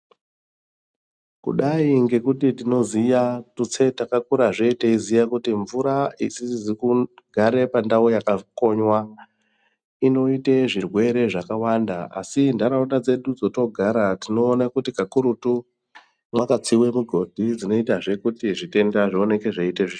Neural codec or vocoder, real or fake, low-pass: none; real; 9.9 kHz